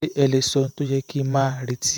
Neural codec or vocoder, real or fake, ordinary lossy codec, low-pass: vocoder, 48 kHz, 128 mel bands, Vocos; fake; none; none